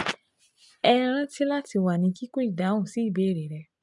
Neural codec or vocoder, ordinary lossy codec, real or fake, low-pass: none; MP3, 96 kbps; real; 10.8 kHz